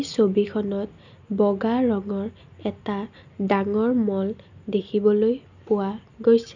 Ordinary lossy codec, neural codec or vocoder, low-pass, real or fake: none; none; 7.2 kHz; real